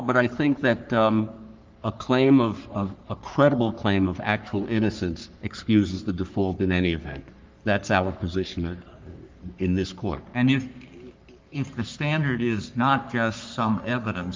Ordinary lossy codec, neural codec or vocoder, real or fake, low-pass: Opus, 24 kbps; codec, 44.1 kHz, 3.4 kbps, Pupu-Codec; fake; 7.2 kHz